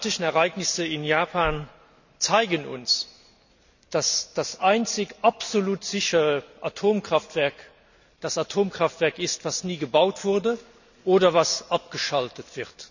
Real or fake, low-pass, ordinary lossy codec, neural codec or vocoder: real; 7.2 kHz; none; none